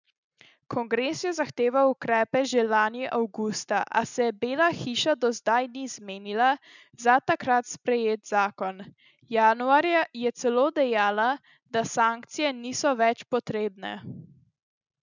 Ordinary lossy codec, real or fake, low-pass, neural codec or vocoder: none; real; 7.2 kHz; none